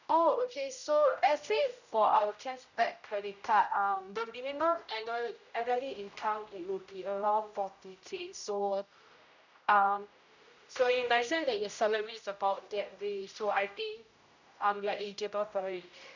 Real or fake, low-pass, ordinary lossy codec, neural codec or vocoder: fake; 7.2 kHz; none; codec, 16 kHz, 0.5 kbps, X-Codec, HuBERT features, trained on general audio